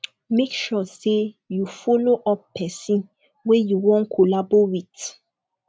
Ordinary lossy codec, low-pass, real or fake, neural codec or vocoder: none; none; real; none